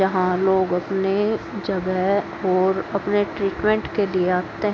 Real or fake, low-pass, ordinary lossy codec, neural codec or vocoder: real; none; none; none